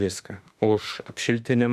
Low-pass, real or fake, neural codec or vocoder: 14.4 kHz; fake; autoencoder, 48 kHz, 32 numbers a frame, DAC-VAE, trained on Japanese speech